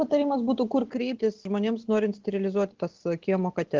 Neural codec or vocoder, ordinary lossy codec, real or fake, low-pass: none; Opus, 16 kbps; real; 7.2 kHz